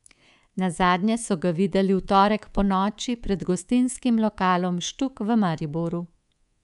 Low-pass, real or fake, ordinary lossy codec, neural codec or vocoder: 10.8 kHz; fake; none; codec, 24 kHz, 3.1 kbps, DualCodec